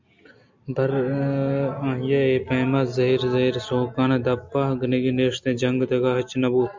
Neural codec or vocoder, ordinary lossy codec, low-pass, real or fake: none; MP3, 64 kbps; 7.2 kHz; real